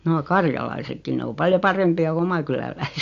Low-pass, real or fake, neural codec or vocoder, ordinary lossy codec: 7.2 kHz; real; none; AAC, 48 kbps